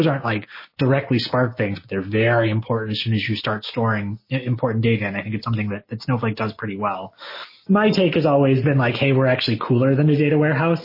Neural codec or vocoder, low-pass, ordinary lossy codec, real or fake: none; 5.4 kHz; MP3, 24 kbps; real